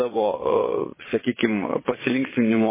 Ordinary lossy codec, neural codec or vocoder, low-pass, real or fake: MP3, 16 kbps; vocoder, 22.05 kHz, 80 mel bands, Vocos; 3.6 kHz; fake